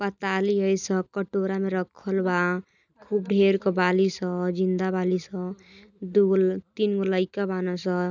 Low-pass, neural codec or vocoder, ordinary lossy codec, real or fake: 7.2 kHz; none; none; real